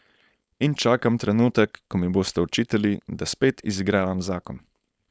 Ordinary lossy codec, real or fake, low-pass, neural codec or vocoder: none; fake; none; codec, 16 kHz, 4.8 kbps, FACodec